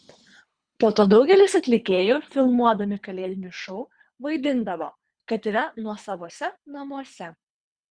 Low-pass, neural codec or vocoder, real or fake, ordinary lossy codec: 9.9 kHz; codec, 24 kHz, 3 kbps, HILCodec; fake; Opus, 64 kbps